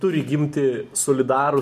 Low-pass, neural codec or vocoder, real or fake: 14.4 kHz; none; real